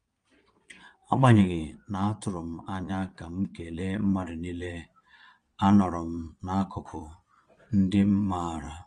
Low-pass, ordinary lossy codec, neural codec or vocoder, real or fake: 9.9 kHz; Opus, 32 kbps; vocoder, 22.05 kHz, 80 mel bands, Vocos; fake